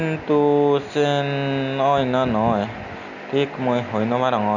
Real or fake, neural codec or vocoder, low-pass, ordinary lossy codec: real; none; 7.2 kHz; none